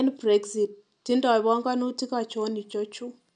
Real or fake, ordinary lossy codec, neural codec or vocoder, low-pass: real; none; none; 9.9 kHz